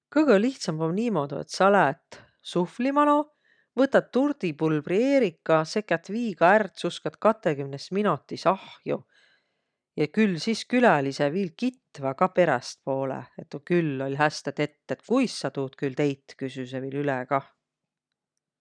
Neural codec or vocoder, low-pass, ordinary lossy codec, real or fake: none; 9.9 kHz; none; real